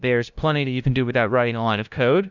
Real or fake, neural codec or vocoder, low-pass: fake; codec, 16 kHz, 0.5 kbps, FunCodec, trained on LibriTTS, 25 frames a second; 7.2 kHz